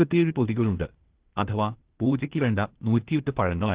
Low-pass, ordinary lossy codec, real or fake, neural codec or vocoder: 3.6 kHz; Opus, 16 kbps; fake; codec, 16 kHz, 0.8 kbps, ZipCodec